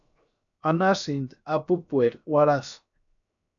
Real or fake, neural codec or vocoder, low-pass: fake; codec, 16 kHz, 0.7 kbps, FocalCodec; 7.2 kHz